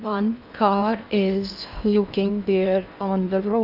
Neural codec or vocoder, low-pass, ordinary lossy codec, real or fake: codec, 16 kHz in and 24 kHz out, 0.8 kbps, FocalCodec, streaming, 65536 codes; 5.4 kHz; none; fake